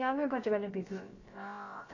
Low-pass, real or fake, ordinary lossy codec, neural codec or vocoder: 7.2 kHz; fake; AAC, 32 kbps; codec, 16 kHz, about 1 kbps, DyCAST, with the encoder's durations